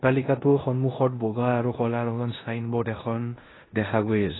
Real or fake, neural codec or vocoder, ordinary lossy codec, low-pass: fake; codec, 16 kHz in and 24 kHz out, 0.9 kbps, LongCat-Audio-Codec, four codebook decoder; AAC, 16 kbps; 7.2 kHz